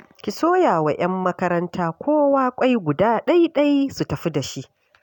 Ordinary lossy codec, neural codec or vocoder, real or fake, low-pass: none; none; real; 19.8 kHz